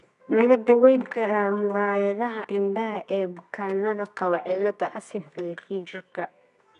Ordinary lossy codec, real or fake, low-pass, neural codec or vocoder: none; fake; 10.8 kHz; codec, 24 kHz, 0.9 kbps, WavTokenizer, medium music audio release